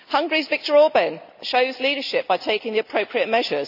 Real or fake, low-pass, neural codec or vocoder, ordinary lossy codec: real; 5.4 kHz; none; none